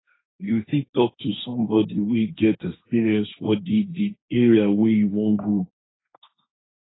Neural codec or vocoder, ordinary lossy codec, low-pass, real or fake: codec, 16 kHz, 1.1 kbps, Voila-Tokenizer; AAC, 16 kbps; 7.2 kHz; fake